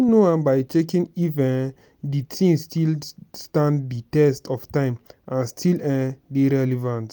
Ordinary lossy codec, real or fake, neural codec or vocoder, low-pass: none; real; none; none